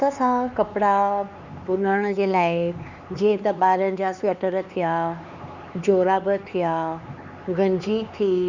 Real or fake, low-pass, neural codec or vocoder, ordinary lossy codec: fake; 7.2 kHz; codec, 16 kHz, 4 kbps, X-Codec, HuBERT features, trained on LibriSpeech; Opus, 64 kbps